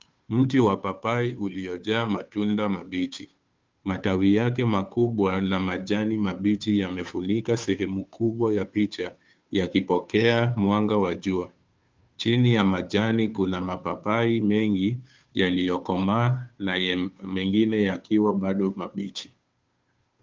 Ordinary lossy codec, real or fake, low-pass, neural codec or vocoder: Opus, 24 kbps; fake; 7.2 kHz; codec, 16 kHz, 2 kbps, FunCodec, trained on Chinese and English, 25 frames a second